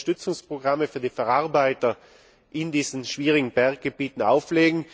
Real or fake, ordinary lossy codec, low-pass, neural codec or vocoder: real; none; none; none